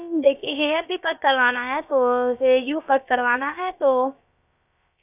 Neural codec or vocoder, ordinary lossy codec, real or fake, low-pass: codec, 16 kHz, about 1 kbps, DyCAST, with the encoder's durations; none; fake; 3.6 kHz